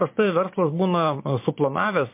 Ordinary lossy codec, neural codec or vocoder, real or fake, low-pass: MP3, 24 kbps; vocoder, 44.1 kHz, 128 mel bands every 512 samples, BigVGAN v2; fake; 3.6 kHz